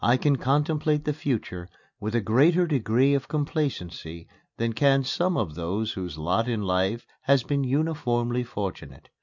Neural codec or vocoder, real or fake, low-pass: none; real; 7.2 kHz